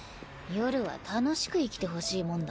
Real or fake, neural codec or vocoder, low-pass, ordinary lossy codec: real; none; none; none